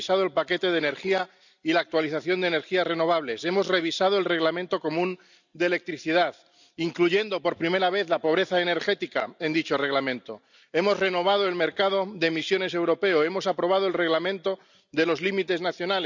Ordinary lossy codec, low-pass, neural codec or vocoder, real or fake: none; 7.2 kHz; none; real